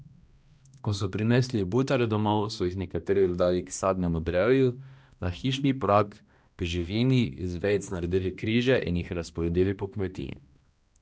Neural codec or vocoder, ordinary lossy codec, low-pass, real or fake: codec, 16 kHz, 1 kbps, X-Codec, HuBERT features, trained on balanced general audio; none; none; fake